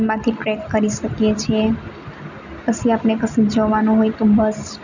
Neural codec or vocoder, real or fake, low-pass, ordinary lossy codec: none; real; 7.2 kHz; none